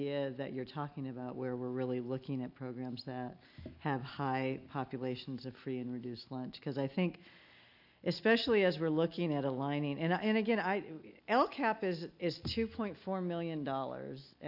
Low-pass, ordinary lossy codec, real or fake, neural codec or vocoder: 5.4 kHz; AAC, 48 kbps; real; none